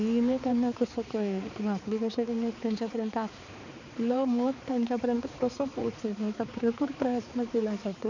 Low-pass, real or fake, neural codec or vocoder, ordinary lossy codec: 7.2 kHz; fake; codec, 16 kHz, 4 kbps, X-Codec, HuBERT features, trained on balanced general audio; none